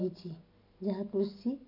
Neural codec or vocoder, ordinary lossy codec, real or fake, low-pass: none; none; real; 5.4 kHz